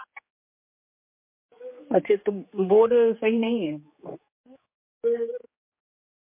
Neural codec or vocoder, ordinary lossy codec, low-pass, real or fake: codec, 16 kHz, 4 kbps, X-Codec, HuBERT features, trained on general audio; MP3, 24 kbps; 3.6 kHz; fake